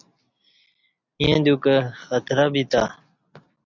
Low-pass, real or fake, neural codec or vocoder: 7.2 kHz; real; none